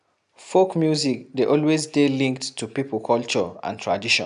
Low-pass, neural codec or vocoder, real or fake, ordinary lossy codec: 10.8 kHz; none; real; none